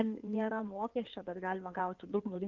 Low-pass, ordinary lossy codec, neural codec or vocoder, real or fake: 7.2 kHz; Opus, 32 kbps; codec, 16 kHz in and 24 kHz out, 2.2 kbps, FireRedTTS-2 codec; fake